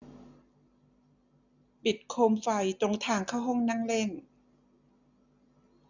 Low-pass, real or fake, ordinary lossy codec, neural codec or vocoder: 7.2 kHz; real; none; none